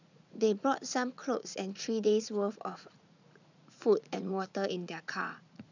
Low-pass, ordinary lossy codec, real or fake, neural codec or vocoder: 7.2 kHz; none; fake; vocoder, 44.1 kHz, 80 mel bands, Vocos